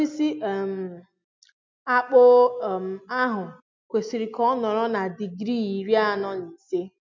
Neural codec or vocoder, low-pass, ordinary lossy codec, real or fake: none; 7.2 kHz; MP3, 64 kbps; real